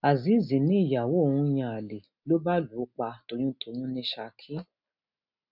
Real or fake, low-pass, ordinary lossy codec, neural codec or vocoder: real; 5.4 kHz; none; none